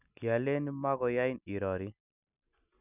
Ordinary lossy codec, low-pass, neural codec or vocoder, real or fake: none; 3.6 kHz; none; real